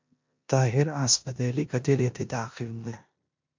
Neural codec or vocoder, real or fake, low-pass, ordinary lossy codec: codec, 16 kHz in and 24 kHz out, 0.9 kbps, LongCat-Audio-Codec, four codebook decoder; fake; 7.2 kHz; MP3, 64 kbps